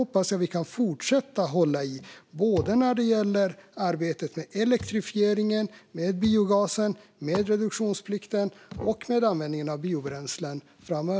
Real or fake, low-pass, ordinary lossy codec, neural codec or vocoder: real; none; none; none